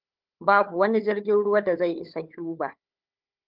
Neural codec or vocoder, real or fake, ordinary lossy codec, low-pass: codec, 16 kHz, 4 kbps, FunCodec, trained on Chinese and English, 50 frames a second; fake; Opus, 32 kbps; 5.4 kHz